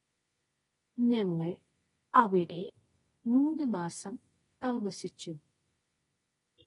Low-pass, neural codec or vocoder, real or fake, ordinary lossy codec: 10.8 kHz; codec, 24 kHz, 0.9 kbps, WavTokenizer, medium music audio release; fake; AAC, 32 kbps